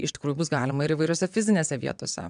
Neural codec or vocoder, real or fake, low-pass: vocoder, 22.05 kHz, 80 mel bands, WaveNeXt; fake; 9.9 kHz